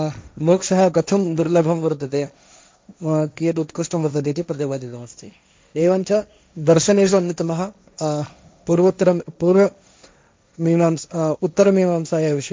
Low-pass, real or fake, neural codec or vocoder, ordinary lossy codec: none; fake; codec, 16 kHz, 1.1 kbps, Voila-Tokenizer; none